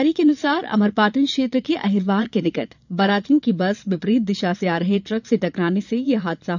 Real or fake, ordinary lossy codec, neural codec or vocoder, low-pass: fake; none; vocoder, 22.05 kHz, 80 mel bands, Vocos; 7.2 kHz